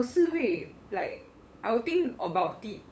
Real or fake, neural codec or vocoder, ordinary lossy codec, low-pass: fake; codec, 16 kHz, 8 kbps, FunCodec, trained on LibriTTS, 25 frames a second; none; none